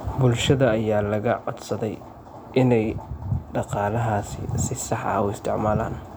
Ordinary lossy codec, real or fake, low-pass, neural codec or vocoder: none; real; none; none